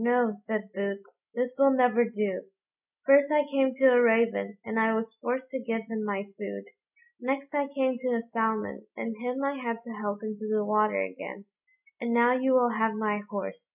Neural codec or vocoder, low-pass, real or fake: none; 3.6 kHz; real